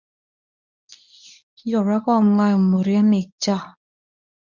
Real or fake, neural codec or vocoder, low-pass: fake; codec, 24 kHz, 0.9 kbps, WavTokenizer, medium speech release version 1; 7.2 kHz